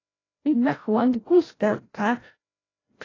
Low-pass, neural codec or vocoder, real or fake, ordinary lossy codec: 7.2 kHz; codec, 16 kHz, 0.5 kbps, FreqCodec, larger model; fake; AAC, 32 kbps